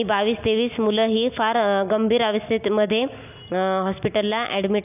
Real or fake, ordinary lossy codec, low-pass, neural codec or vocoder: real; none; 3.6 kHz; none